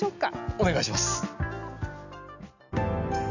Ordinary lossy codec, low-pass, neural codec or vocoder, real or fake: none; 7.2 kHz; none; real